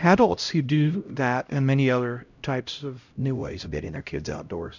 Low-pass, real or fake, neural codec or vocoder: 7.2 kHz; fake; codec, 16 kHz, 0.5 kbps, X-Codec, HuBERT features, trained on LibriSpeech